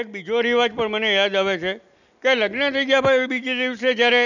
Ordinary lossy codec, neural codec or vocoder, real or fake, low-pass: none; none; real; 7.2 kHz